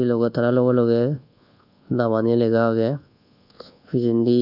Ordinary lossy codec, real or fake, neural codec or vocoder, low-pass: none; fake; codec, 24 kHz, 1.2 kbps, DualCodec; 5.4 kHz